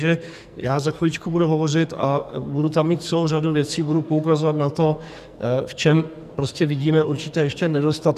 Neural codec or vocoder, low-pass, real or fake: codec, 44.1 kHz, 2.6 kbps, SNAC; 14.4 kHz; fake